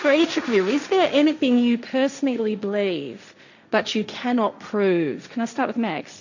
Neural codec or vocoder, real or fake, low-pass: codec, 16 kHz, 1.1 kbps, Voila-Tokenizer; fake; 7.2 kHz